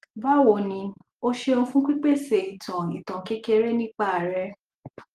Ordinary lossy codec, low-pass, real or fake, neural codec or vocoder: Opus, 24 kbps; 14.4 kHz; real; none